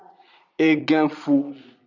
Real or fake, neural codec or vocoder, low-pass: fake; vocoder, 22.05 kHz, 80 mel bands, Vocos; 7.2 kHz